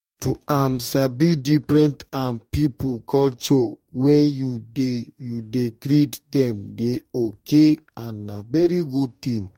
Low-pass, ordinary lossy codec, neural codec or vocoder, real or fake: 19.8 kHz; MP3, 64 kbps; codec, 44.1 kHz, 2.6 kbps, DAC; fake